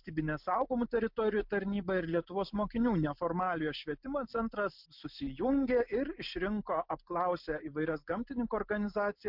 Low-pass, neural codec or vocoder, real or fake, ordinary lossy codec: 5.4 kHz; none; real; MP3, 48 kbps